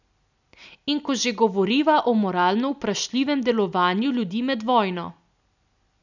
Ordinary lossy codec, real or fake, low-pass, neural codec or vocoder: none; real; 7.2 kHz; none